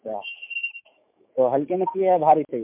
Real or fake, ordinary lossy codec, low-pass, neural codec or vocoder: fake; MP3, 32 kbps; 3.6 kHz; autoencoder, 48 kHz, 128 numbers a frame, DAC-VAE, trained on Japanese speech